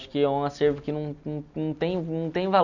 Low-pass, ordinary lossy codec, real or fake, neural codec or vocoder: 7.2 kHz; none; real; none